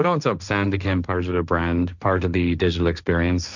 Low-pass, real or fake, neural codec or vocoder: 7.2 kHz; fake; codec, 16 kHz, 1.1 kbps, Voila-Tokenizer